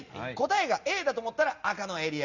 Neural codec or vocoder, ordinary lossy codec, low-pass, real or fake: none; Opus, 64 kbps; 7.2 kHz; real